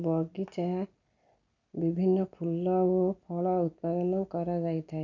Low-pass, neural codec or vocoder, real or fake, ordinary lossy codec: 7.2 kHz; none; real; none